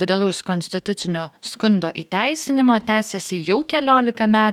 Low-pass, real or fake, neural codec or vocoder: 19.8 kHz; fake; codec, 44.1 kHz, 2.6 kbps, DAC